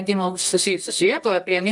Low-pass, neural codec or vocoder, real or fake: 10.8 kHz; codec, 24 kHz, 0.9 kbps, WavTokenizer, medium music audio release; fake